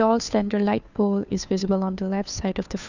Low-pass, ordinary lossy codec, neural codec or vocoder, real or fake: 7.2 kHz; none; codec, 16 kHz, 2 kbps, FunCodec, trained on Chinese and English, 25 frames a second; fake